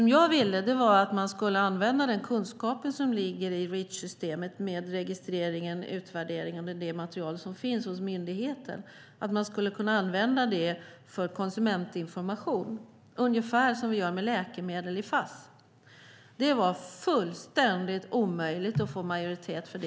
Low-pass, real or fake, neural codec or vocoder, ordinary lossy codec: none; real; none; none